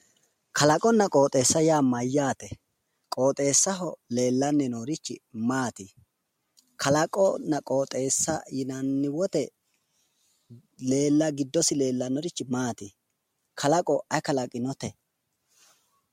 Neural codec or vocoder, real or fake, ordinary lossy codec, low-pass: none; real; MP3, 64 kbps; 19.8 kHz